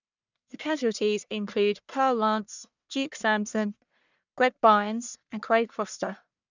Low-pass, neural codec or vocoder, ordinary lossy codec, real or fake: 7.2 kHz; codec, 44.1 kHz, 1.7 kbps, Pupu-Codec; none; fake